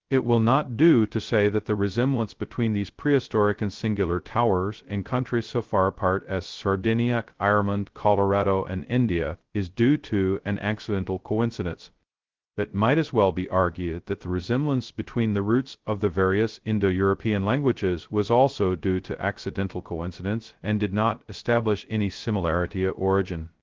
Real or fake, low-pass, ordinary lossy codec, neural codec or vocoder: fake; 7.2 kHz; Opus, 16 kbps; codec, 16 kHz, 0.2 kbps, FocalCodec